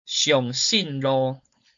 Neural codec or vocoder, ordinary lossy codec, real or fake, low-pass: codec, 16 kHz, 4.8 kbps, FACodec; MP3, 48 kbps; fake; 7.2 kHz